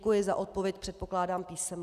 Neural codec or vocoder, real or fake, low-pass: none; real; 14.4 kHz